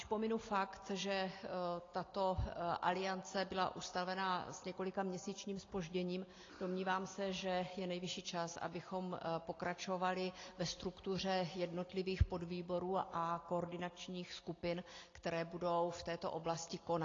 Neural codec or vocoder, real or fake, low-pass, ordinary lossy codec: none; real; 7.2 kHz; AAC, 32 kbps